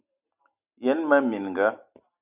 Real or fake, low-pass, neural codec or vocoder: real; 3.6 kHz; none